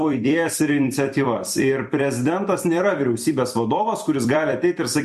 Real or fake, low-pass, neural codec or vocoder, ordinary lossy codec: fake; 14.4 kHz; vocoder, 44.1 kHz, 128 mel bands every 256 samples, BigVGAN v2; MP3, 64 kbps